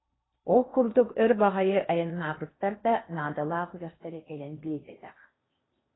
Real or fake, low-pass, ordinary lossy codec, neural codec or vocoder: fake; 7.2 kHz; AAC, 16 kbps; codec, 16 kHz in and 24 kHz out, 0.8 kbps, FocalCodec, streaming, 65536 codes